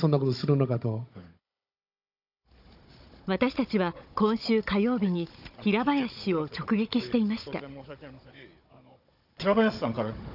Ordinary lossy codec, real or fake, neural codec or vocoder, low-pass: none; fake; codec, 16 kHz, 16 kbps, FunCodec, trained on Chinese and English, 50 frames a second; 5.4 kHz